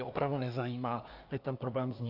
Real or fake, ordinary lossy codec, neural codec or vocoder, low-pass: fake; AAC, 48 kbps; codec, 24 kHz, 1 kbps, SNAC; 5.4 kHz